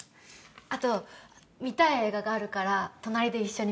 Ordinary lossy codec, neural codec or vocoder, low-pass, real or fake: none; none; none; real